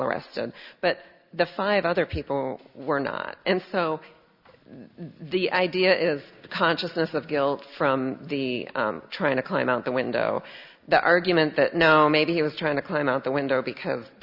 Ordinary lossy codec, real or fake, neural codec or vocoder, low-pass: Opus, 64 kbps; real; none; 5.4 kHz